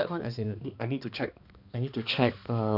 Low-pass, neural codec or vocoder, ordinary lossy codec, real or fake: 5.4 kHz; codec, 16 kHz, 2 kbps, X-Codec, HuBERT features, trained on balanced general audio; none; fake